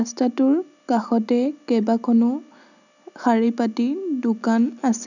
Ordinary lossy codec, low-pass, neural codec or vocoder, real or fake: none; 7.2 kHz; none; real